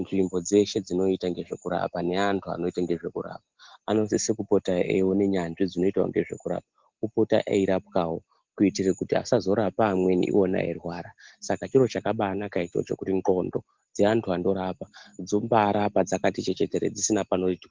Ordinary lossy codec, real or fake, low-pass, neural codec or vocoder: Opus, 16 kbps; real; 7.2 kHz; none